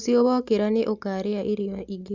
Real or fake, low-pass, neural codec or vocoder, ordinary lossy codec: real; 7.2 kHz; none; Opus, 64 kbps